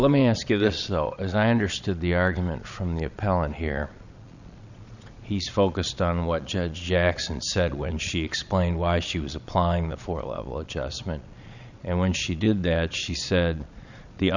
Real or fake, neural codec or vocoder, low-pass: fake; vocoder, 22.05 kHz, 80 mel bands, Vocos; 7.2 kHz